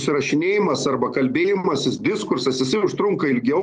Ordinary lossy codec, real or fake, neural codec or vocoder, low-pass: Opus, 32 kbps; real; none; 9.9 kHz